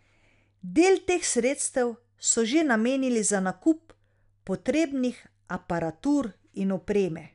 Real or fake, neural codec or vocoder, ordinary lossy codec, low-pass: real; none; none; 10.8 kHz